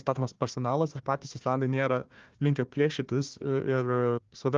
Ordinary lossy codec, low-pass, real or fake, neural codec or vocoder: Opus, 32 kbps; 7.2 kHz; fake; codec, 16 kHz, 1 kbps, FunCodec, trained on Chinese and English, 50 frames a second